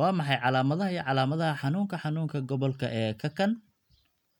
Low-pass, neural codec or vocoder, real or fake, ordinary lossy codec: 19.8 kHz; none; real; MP3, 96 kbps